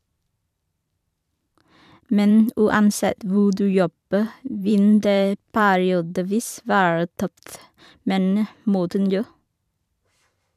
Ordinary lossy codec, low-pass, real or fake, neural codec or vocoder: none; 14.4 kHz; fake; vocoder, 44.1 kHz, 128 mel bands every 512 samples, BigVGAN v2